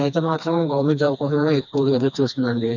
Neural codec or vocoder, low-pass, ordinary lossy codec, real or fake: codec, 16 kHz, 2 kbps, FreqCodec, smaller model; 7.2 kHz; none; fake